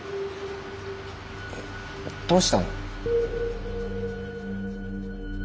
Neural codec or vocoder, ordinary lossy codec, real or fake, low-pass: none; none; real; none